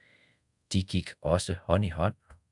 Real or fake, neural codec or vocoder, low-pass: fake; codec, 24 kHz, 0.5 kbps, DualCodec; 10.8 kHz